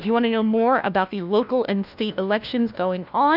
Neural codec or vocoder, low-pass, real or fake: codec, 16 kHz, 1 kbps, FunCodec, trained on LibriTTS, 50 frames a second; 5.4 kHz; fake